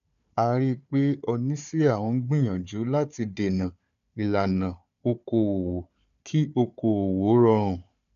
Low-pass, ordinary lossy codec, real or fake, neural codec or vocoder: 7.2 kHz; none; fake; codec, 16 kHz, 4 kbps, FunCodec, trained on Chinese and English, 50 frames a second